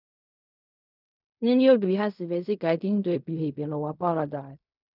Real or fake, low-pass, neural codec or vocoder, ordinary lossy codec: fake; 5.4 kHz; codec, 16 kHz in and 24 kHz out, 0.4 kbps, LongCat-Audio-Codec, fine tuned four codebook decoder; none